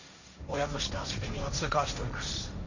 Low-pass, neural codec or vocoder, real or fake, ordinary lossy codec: 7.2 kHz; codec, 16 kHz, 1.1 kbps, Voila-Tokenizer; fake; none